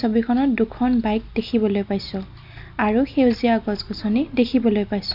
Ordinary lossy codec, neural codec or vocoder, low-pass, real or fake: none; none; 5.4 kHz; real